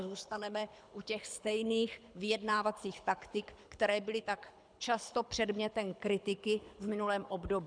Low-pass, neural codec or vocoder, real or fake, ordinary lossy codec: 9.9 kHz; codec, 24 kHz, 6 kbps, HILCodec; fake; MP3, 96 kbps